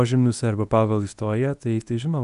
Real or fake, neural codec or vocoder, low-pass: fake; codec, 24 kHz, 0.9 kbps, WavTokenizer, medium speech release version 1; 10.8 kHz